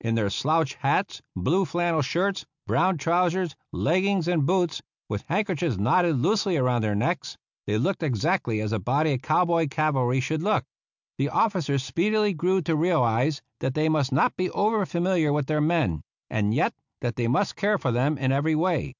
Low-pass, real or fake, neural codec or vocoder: 7.2 kHz; real; none